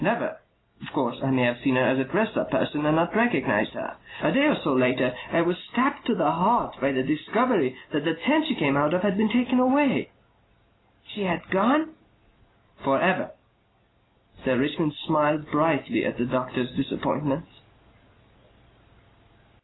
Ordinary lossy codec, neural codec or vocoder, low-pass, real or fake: AAC, 16 kbps; none; 7.2 kHz; real